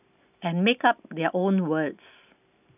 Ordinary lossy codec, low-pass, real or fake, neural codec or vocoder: none; 3.6 kHz; real; none